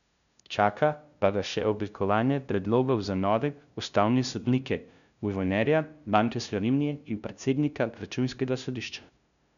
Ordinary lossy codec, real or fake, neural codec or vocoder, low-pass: none; fake; codec, 16 kHz, 0.5 kbps, FunCodec, trained on LibriTTS, 25 frames a second; 7.2 kHz